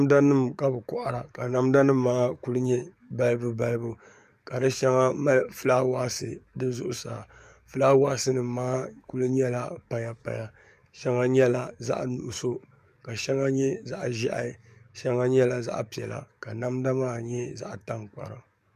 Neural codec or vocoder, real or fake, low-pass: codec, 44.1 kHz, 7.8 kbps, DAC; fake; 14.4 kHz